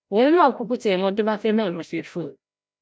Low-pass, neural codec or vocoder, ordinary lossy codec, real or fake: none; codec, 16 kHz, 0.5 kbps, FreqCodec, larger model; none; fake